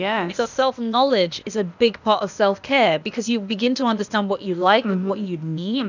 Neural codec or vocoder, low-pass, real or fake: codec, 16 kHz, 0.8 kbps, ZipCodec; 7.2 kHz; fake